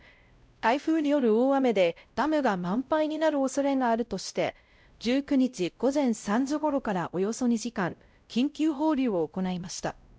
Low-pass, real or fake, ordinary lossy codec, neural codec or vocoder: none; fake; none; codec, 16 kHz, 0.5 kbps, X-Codec, WavLM features, trained on Multilingual LibriSpeech